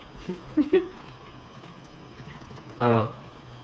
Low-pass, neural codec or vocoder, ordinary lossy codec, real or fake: none; codec, 16 kHz, 4 kbps, FreqCodec, smaller model; none; fake